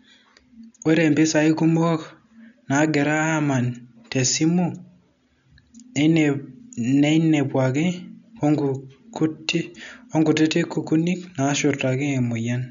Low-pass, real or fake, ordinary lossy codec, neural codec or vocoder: 7.2 kHz; real; none; none